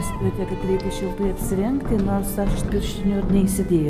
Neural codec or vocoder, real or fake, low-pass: none; real; 14.4 kHz